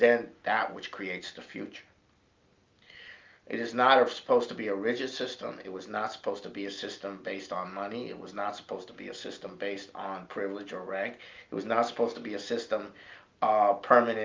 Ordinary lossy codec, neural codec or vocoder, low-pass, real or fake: Opus, 24 kbps; none; 7.2 kHz; real